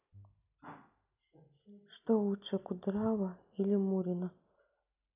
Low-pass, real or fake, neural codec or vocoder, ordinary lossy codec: 3.6 kHz; real; none; none